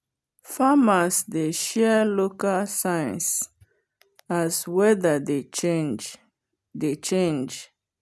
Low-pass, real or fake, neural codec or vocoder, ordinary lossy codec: none; real; none; none